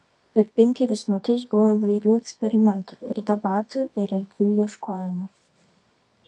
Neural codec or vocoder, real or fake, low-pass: codec, 24 kHz, 0.9 kbps, WavTokenizer, medium music audio release; fake; 10.8 kHz